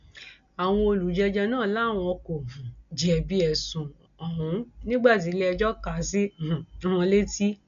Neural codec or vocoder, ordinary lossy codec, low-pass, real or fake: none; none; 7.2 kHz; real